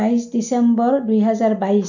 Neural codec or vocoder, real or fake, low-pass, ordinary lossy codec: none; real; 7.2 kHz; none